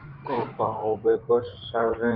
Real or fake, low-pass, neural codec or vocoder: fake; 5.4 kHz; codec, 16 kHz in and 24 kHz out, 2.2 kbps, FireRedTTS-2 codec